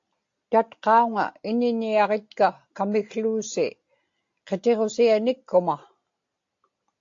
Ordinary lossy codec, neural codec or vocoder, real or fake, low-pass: AAC, 48 kbps; none; real; 7.2 kHz